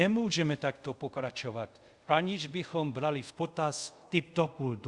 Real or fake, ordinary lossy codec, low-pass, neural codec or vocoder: fake; Opus, 64 kbps; 10.8 kHz; codec, 24 kHz, 0.5 kbps, DualCodec